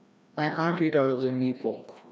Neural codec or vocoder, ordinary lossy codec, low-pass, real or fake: codec, 16 kHz, 1 kbps, FreqCodec, larger model; none; none; fake